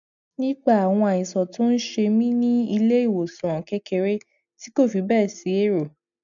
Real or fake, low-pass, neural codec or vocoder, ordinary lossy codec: real; 7.2 kHz; none; none